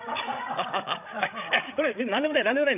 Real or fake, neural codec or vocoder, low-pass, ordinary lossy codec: fake; codec, 16 kHz, 16 kbps, FreqCodec, larger model; 3.6 kHz; none